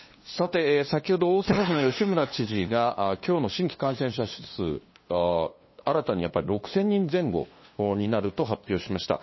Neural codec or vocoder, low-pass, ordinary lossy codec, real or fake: codec, 16 kHz, 2 kbps, FunCodec, trained on LibriTTS, 25 frames a second; 7.2 kHz; MP3, 24 kbps; fake